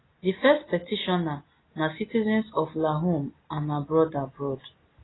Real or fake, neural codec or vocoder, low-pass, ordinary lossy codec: real; none; 7.2 kHz; AAC, 16 kbps